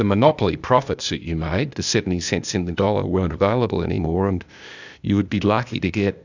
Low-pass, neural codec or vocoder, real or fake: 7.2 kHz; codec, 16 kHz, 0.8 kbps, ZipCodec; fake